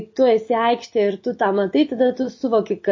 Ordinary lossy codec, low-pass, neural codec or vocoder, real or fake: MP3, 32 kbps; 7.2 kHz; none; real